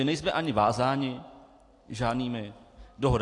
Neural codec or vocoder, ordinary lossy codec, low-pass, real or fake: none; AAC, 48 kbps; 10.8 kHz; real